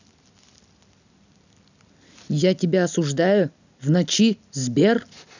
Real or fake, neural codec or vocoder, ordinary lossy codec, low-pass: real; none; none; 7.2 kHz